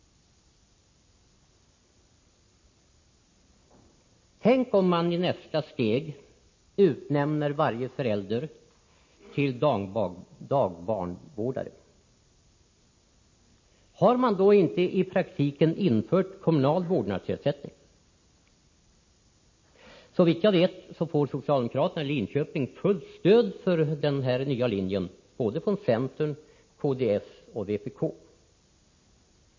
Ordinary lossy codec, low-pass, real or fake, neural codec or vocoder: MP3, 32 kbps; 7.2 kHz; real; none